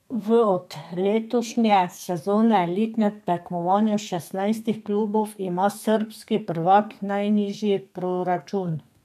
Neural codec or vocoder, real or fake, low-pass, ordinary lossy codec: codec, 32 kHz, 1.9 kbps, SNAC; fake; 14.4 kHz; MP3, 96 kbps